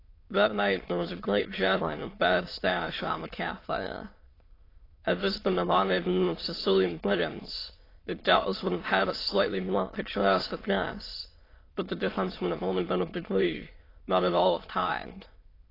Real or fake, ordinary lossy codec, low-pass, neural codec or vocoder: fake; AAC, 24 kbps; 5.4 kHz; autoencoder, 22.05 kHz, a latent of 192 numbers a frame, VITS, trained on many speakers